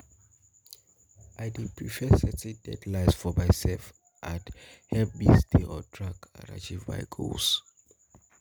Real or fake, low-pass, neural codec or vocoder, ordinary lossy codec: real; none; none; none